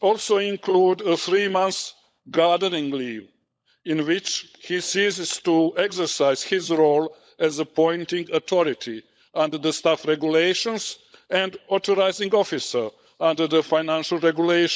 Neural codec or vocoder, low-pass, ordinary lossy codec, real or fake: codec, 16 kHz, 16 kbps, FunCodec, trained on LibriTTS, 50 frames a second; none; none; fake